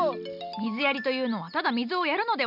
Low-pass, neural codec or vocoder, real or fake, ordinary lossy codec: 5.4 kHz; none; real; none